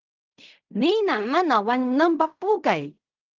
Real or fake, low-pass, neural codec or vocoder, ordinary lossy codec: fake; 7.2 kHz; codec, 16 kHz in and 24 kHz out, 0.4 kbps, LongCat-Audio-Codec, fine tuned four codebook decoder; Opus, 32 kbps